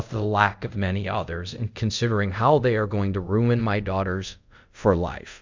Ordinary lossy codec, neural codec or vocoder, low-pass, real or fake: MP3, 64 kbps; codec, 24 kHz, 0.5 kbps, DualCodec; 7.2 kHz; fake